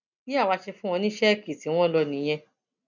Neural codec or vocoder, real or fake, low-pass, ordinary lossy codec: none; real; 7.2 kHz; none